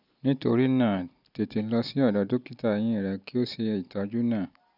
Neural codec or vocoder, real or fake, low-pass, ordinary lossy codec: none; real; 5.4 kHz; none